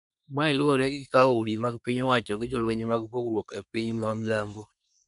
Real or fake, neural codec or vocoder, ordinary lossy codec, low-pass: fake; codec, 24 kHz, 1 kbps, SNAC; none; 10.8 kHz